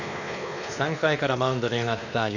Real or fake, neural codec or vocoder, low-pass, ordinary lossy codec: fake; codec, 16 kHz, 2 kbps, X-Codec, WavLM features, trained on Multilingual LibriSpeech; 7.2 kHz; none